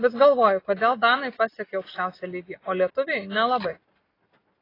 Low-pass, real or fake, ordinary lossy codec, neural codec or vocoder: 5.4 kHz; real; AAC, 24 kbps; none